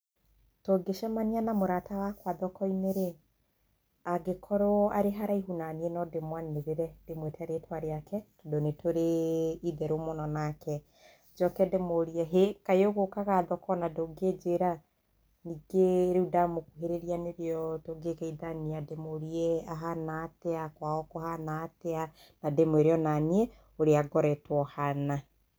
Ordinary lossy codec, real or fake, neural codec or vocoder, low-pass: none; real; none; none